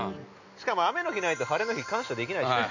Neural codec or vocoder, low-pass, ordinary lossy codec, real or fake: none; 7.2 kHz; none; real